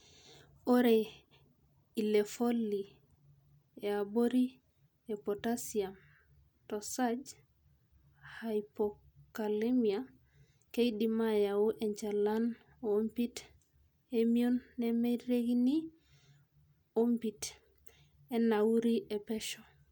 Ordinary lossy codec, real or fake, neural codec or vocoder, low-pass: none; real; none; none